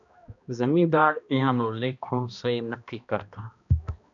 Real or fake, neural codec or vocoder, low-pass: fake; codec, 16 kHz, 1 kbps, X-Codec, HuBERT features, trained on balanced general audio; 7.2 kHz